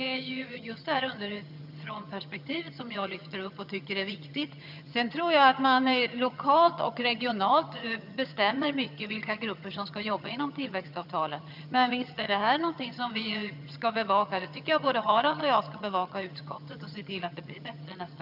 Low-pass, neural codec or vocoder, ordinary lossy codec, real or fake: 5.4 kHz; vocoder, 22.05 kHz, 80 mel bands, HiFi-GAN; none; fake